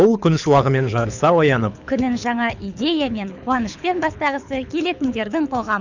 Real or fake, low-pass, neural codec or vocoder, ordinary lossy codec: fake; 7.2 kHz; codec, 24 kHz, 6 kbps, HILCodec; none